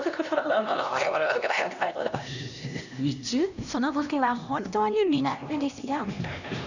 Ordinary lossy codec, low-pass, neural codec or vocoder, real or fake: none; 7.2 kHz; codec, 16 kHz, 1 kbps, X-Codec, HuBERT features, trained on LibriSpeech; fake